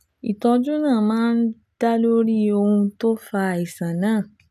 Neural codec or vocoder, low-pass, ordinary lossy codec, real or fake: none; 14.4 kHz; none; real